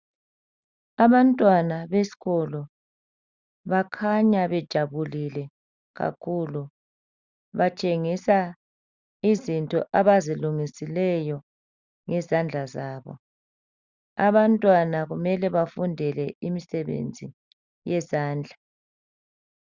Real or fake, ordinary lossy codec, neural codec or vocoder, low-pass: real; Opus, 64 kbps; none; 7.2 kHz